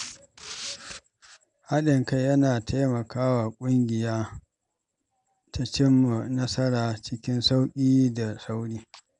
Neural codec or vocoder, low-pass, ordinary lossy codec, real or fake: none; 9.9 kHz; none; real